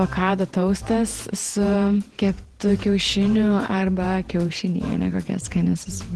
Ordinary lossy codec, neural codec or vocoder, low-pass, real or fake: Opus, 16 kbps; vocoder, 48 kHz, 128 mel bands, Vocos; 10.8 kHz; fake